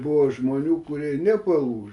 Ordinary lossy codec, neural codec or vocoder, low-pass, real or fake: AAC, 64 kbps; none; 10.8 kHz; real